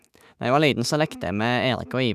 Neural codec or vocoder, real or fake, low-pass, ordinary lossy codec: autoencoder, 48 kHz, 128 numbers a frame, DAC-VAE, trained on Japanese speech; fake; 14.4 kHz; none